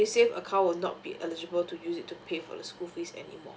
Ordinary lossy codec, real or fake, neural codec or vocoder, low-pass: none; real; none; none